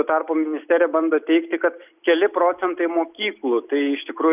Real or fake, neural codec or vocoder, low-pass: real; none; 3.6 kHz